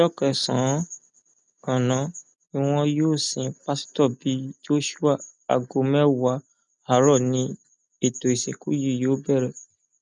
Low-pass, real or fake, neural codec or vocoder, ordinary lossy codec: 9.9 kHz; real; none; none